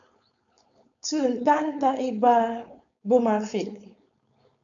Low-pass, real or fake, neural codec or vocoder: 7.2 kHz; fake; codec, 16 kHz, 4.8 kbps, FACodec